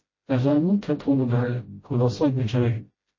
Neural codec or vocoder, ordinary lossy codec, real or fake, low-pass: codec, 16 kHz, 0.5 kbps, FreqCodec, smaller model; MP3, 32 kbps; fake; 7.2 kHz